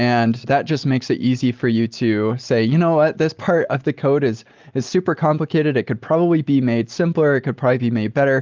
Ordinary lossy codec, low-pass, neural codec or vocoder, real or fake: Opus, 32 kbps; 7.2 kHz; none; real